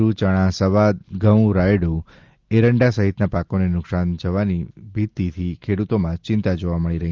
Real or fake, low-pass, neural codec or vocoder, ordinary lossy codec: real; 7.2 kHz; none; Opus, 32 kbps